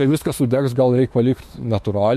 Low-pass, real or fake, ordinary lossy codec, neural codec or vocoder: 14.4 kHz; fake; MP3, 64 kbps; autoencoder, 48 kHz, 32 numbers a frame, DAC-VAE, trained on Japanese speech